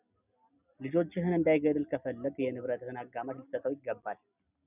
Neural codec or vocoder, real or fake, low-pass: none; real; 3.6 kHz